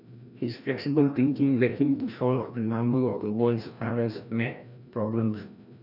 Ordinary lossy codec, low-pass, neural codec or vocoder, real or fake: none; 5.4 kHz; codec, 16 kHz, 1 kbps, FreqCodec, larger model; fake